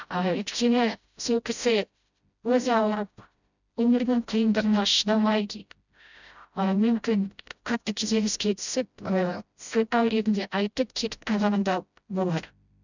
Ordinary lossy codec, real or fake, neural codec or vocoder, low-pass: none; fake; codec, 16 kHz, 0.5 kbps, FreqCodec, smaller model; 7.2 kHz